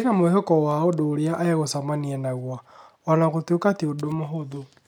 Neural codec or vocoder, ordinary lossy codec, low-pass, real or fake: none; none; 19.8 kHz; real